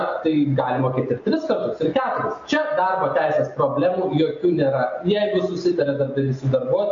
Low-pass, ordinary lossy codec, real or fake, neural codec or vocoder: 7.2 kHz; MP3, 48 kbps; real; none